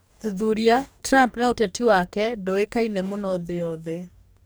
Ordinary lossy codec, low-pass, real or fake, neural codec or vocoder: none; none; fake; codec, 44.1 kHz, 2.6 kbps, DAC